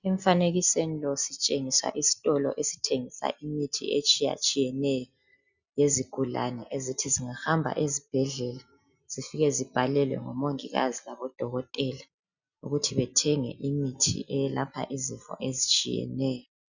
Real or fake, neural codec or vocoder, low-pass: real; none; 7.2 kHz